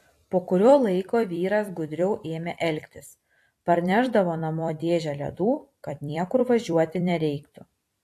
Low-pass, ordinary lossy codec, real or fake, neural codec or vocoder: 14.4 kHz; AAC, 64 kbps; fake; vocoder, 44.1 kHz, 128 mel bands every 256 samples, BigVGAN v2